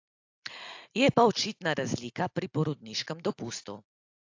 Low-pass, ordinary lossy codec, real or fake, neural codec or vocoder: 7.2 kHz; AAC, 48 kbps; real; none